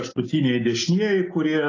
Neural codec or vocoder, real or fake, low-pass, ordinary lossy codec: none; real; 7.2 kHz; AAC, 32 kbps